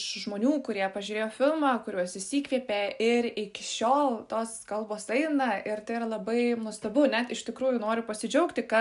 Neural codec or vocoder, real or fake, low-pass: none; real; 10.8 kHz